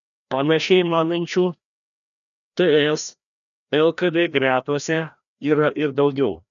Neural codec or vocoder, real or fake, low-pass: codec, 16 kHz, 1 kbps, FreqCodec, larger model; fake; 7.2 kHz